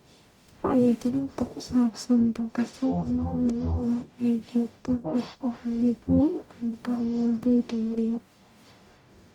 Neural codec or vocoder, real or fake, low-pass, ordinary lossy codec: codec, 44.1 kHz, 0.9 kbps, DAC; fake; 19.8 kHz; Opus, 64 kbps